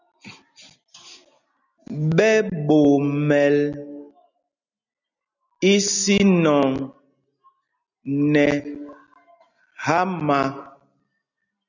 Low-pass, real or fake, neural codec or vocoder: 7.2 kHz; real; none